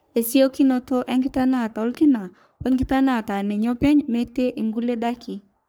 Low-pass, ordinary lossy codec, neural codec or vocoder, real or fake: none; none; codec, 44.1 kHz, 3.4 kbps, Pupu-Codec; fake